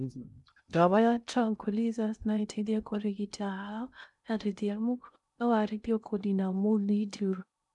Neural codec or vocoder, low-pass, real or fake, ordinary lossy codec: codec, 16 kHz in and 24 kHz out, 0.6 kbps, FocalCodec, streaming, 2048 codes; 10.8 kHz; fake; none